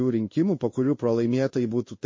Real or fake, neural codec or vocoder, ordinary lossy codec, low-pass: fake; codec, 16 kHz in and 24 kHz out, 1 kbps, XY-Tokenizer; MP3, 32 kbps; 7.2 kHz